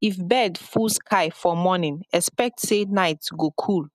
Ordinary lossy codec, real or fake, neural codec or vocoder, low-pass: none; real; none; 14.4 kHz